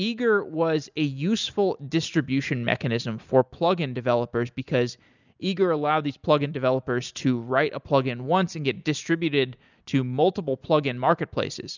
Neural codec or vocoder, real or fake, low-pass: none; real; 7.2 kHz